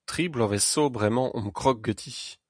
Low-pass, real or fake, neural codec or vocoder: 9.9 kHz; real; none